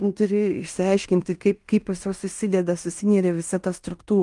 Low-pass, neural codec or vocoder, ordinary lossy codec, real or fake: 10.8 kHz; codec, 16 kHz in and 24 kHz out, 0.9 kbps, LongCat-Audio-Codec, fine tuned four codebook decoder; Opus, 32 kbps; fake